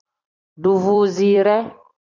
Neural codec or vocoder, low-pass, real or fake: vocoder, 44.1 kHz, 128 mel bands every 256 samples, BigVGAN v2; 7.2 kHz; fake